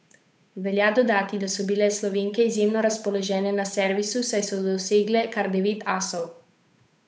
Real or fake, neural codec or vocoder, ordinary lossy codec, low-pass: fake; codec, 16 kHz, 8 kbps, FunCodec, trained on Chinese and English, 25 frames a second; none; none